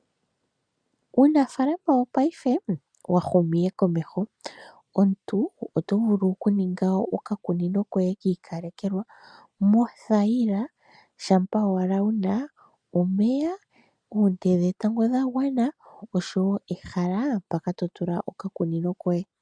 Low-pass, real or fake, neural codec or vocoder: 9.9 kHz; real; none